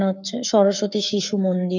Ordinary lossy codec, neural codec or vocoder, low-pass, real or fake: none; codec, 44.1 kHz, 7.8 kbps, Pupu-Codec; 7.2 kHz; fake